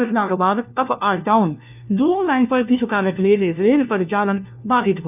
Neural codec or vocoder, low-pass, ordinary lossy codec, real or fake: codec, 16 kHz, 1 kbps, FunCodec, trained on LibriTTS, 50 frames a second; 3.6 kHz; none; fake